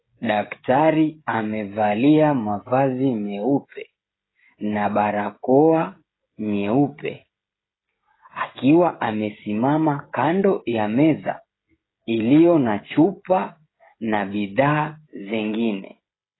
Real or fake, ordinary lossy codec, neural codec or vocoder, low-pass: fake; AAC, 16 kbps; codec, 16 kHz, 16 kbps, FreqCodec, smaller model; 7.2 kHz